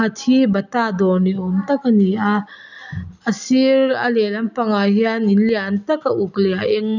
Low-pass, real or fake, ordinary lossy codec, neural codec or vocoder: 7.2 kHz; real; none; none